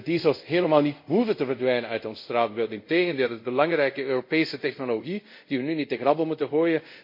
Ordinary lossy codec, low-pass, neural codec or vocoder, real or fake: MP3, 32 kbps; 5.4 kHz; codec, 24 kHz, 0.5 kbps, DualCodec; fake